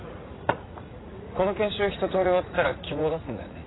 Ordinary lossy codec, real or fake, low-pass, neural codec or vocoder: AAC, 16 kbps; fake; 7.2 kHz; vocoder, 44.1 kHz, 80 mel bands, Vocos